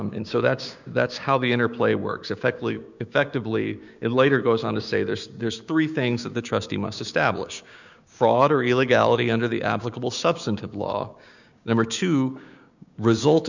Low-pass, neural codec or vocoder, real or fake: 7.2 kHz; codec, 16 kHz, 6 kbps, DAC; fake